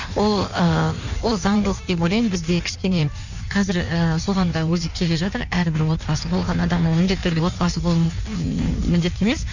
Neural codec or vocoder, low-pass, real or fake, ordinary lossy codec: codec, 16 kHz in and 24 kHz out, 1.1 kbps, FireRedTTS-2 codec; 7.2 kHz; fake; none